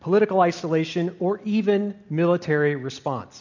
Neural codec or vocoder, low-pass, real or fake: none; 7.2 kHz; real